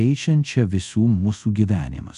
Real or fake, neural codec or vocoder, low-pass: fake; codec, 24 kHz, 0.5 kbps, DualCodec; 10.8 kHz